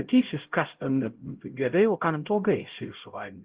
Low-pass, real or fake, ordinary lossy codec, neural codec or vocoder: 3.6 kHz; fake; Opus, 16 kbps; codec, 16 kHz, 0.5 kbps, X-Codec, HuBERT features, trained on LibriSpeech